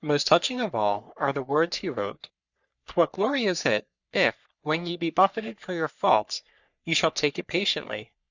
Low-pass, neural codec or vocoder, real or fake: 7.2 kHz; codec, 44.1 kHz, 3.4 kbps, Pupu-Codec; fake